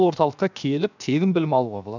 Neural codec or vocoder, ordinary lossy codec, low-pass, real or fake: codec, 16 kHz, 0.7 kbps, FocalCodec; none; 7.2 kHz; fake